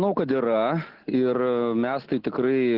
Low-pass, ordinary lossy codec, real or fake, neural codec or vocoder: 5.4 kHz; Opus, 32 kbps; real; none